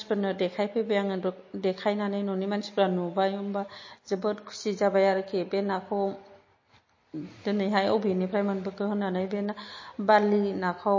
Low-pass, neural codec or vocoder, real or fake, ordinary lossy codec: 7.2 kHz; none; real; MP3, 32 kbps